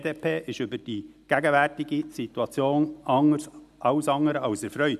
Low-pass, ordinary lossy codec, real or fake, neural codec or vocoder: 14.4 kHz; none; real; none